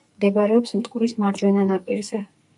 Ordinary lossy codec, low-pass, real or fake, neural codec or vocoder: MP3, 96 kbps; 10.8 kHz; fake; codec, 44.1 kHz, 2.6 kbps, SNAC